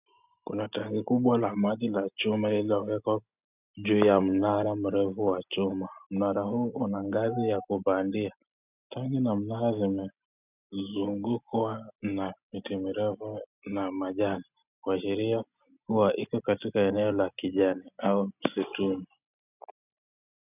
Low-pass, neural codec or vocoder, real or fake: 3.6 kHz; none; real